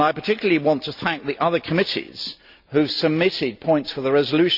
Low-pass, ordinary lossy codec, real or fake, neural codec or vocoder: 5.4 kHz; Opus, 64 kbps; real; none